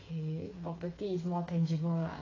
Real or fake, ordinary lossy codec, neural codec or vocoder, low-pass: fake; AAC, 32 kbps; codec, 16 kHz, 4 kbps, FreqCodec, smaller model; 7.2 kHz